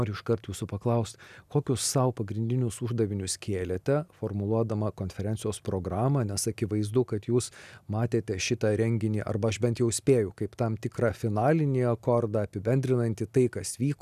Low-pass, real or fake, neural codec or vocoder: 14.4 kHz; real; none